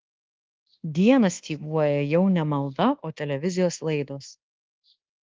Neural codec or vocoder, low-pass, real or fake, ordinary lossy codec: codec, 24 kHz, 1.2 kbps, DualCodec; 7.2 kHz; fake; Opus, 16 kbps